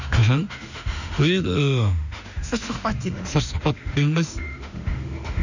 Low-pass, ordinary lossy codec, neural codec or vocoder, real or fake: 7.2 kHz; none; autoencoder, 48 kHz, 32 numbers a frame, DAC-VAE, trained on Japanese speech; fake